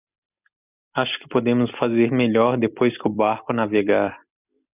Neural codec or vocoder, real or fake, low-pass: vocoder, 44.1 kHz, 128 mel bands every 256 samples, BigVGAN v2; fake; 3.6 kHz